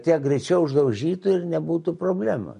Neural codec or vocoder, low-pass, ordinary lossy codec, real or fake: none; 14.4 kHz; MP3, 48 kbps; real